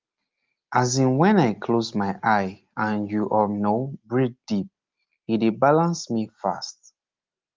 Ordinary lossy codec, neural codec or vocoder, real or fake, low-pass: Opus, 32 kbps; none; real; 7.2 kHz